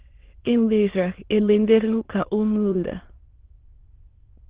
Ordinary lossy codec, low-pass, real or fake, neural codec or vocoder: Opus, 16 kbps; 3.6 kHz; fake; autoencoder, 22.05 kHz, a latent of 192 numbers a frame, VITS, trained on many speakers